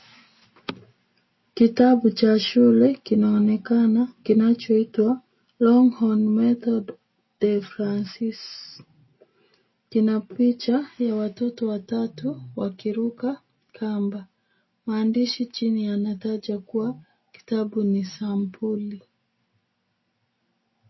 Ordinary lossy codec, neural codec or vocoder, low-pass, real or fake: MP3, 24 kbps; none; 7.2 kHz; real